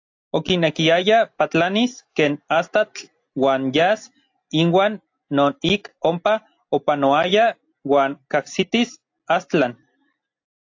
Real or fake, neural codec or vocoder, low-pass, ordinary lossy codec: real; none; 7.2 kHz; AAC, 48 kbps